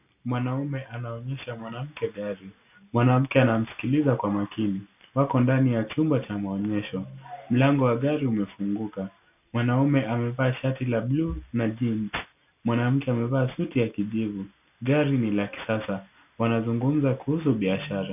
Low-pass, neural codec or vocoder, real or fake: 3.6 kHz; none; real